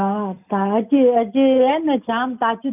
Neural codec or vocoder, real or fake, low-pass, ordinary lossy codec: none; real; 3.6 kHz; none